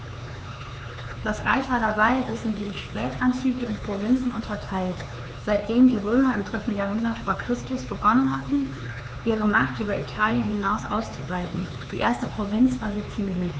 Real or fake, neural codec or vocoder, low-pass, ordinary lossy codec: fake; codec, 16 kHz, 4 kbps, X-Codec, HuBERT features, trained on LibriSpeech; none; none